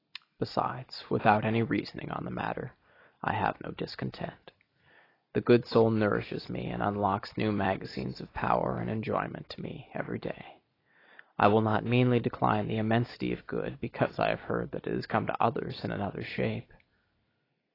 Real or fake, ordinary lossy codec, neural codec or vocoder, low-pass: real; AAC, 24 kbps; none; 5.4 kHz